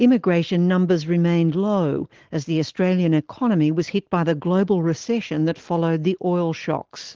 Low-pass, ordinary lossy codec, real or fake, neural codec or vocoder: 7.2 kHz; Opus, 16 kbps; real; none